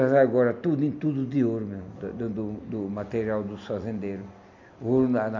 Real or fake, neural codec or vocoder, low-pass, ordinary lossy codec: real; none; 7.2 kHz; none